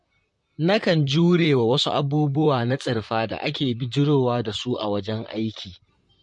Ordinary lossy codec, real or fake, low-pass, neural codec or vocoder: MP3, 48 kbps; fake; 10.8 kHz; vocoder, 44.1 kHz, 128 mel bands, Pupu-Vocoder